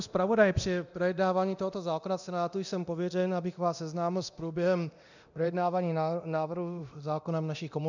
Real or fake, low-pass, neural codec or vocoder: fake; 7.2 kHz; codec, 24 kHz, 0.9 kbps, DualCodec